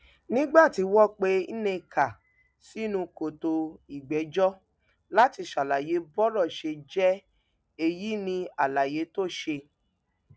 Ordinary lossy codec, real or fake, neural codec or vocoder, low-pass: none; real; none; none